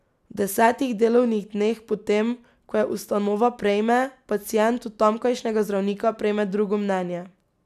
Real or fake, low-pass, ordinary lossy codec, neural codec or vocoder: real; 14.4 kHz; none; none